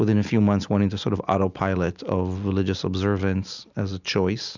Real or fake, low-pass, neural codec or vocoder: real; 7.2 kHz; none